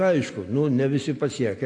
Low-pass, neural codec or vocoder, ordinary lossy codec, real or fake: 9.9 kHz; none; AAC, 48 kbps; real